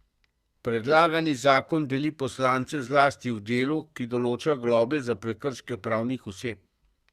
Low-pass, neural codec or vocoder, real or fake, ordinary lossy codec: 14.4 kHz; codec, 32 kHz, 1.9 kbps, SNAC; fake; Opus, 64 kbps